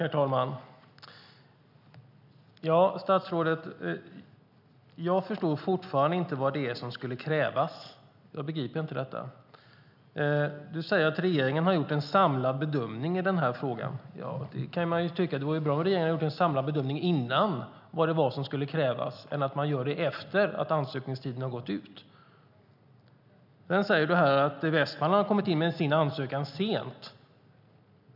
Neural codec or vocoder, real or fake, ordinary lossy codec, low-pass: none; real; none; 5.4 kHz